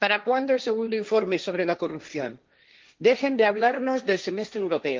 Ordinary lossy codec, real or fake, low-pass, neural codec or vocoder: Opus, 24 kbps; fake; 7.2 kHz; codec, 16 kHz, 1.1 kbps, Voila-Tokenizer